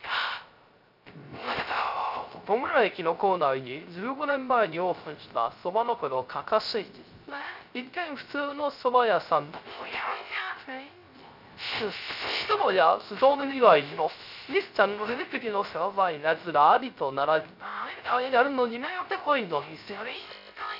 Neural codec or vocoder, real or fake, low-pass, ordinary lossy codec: codec, 16 kHz, 0.3 kbps, FocalCodec; fake; 5.4 kHz; none